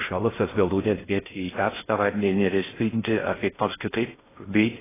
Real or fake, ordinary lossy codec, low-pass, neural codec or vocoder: fake; AAC, 16 kbps; 3.6 kHz; codec, 16 kHz in and 24 kHz out, 0.6 kbps, FocalCodec, streaming, 4096 codes